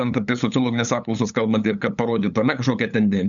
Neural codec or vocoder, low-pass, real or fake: codec, 16 kHz, 8 kbps, FunCodec, trained on LibriTTS, 25 frames a second; 7.2 kHz; fake